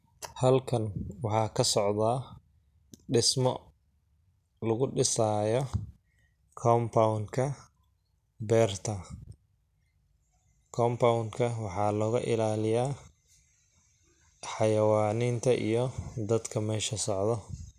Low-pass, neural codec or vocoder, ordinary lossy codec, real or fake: 14.4 kHz; none; none; real